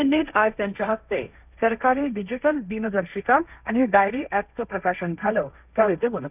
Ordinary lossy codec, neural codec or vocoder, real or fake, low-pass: none; codec, 16 kHz, 1.1 kbps, Voila-Tokenizer; fake; 3.6 kHz